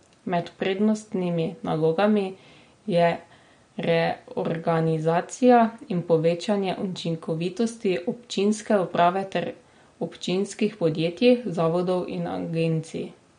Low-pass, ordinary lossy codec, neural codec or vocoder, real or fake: 9.9 kHz; MP3, 48 kbps; none; real